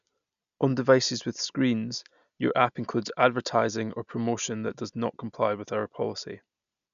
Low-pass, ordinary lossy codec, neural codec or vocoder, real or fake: 7.2 kHz; none; none; real